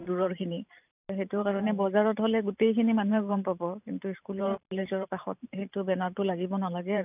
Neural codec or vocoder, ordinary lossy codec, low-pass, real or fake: vocoder, 44.1 kHz, 128 mel bands every 512 samples, BigVGAN v2; none; 3.6 kHz; fake